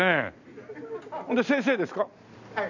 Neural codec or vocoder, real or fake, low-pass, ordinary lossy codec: none; real; 7.2 kHz; none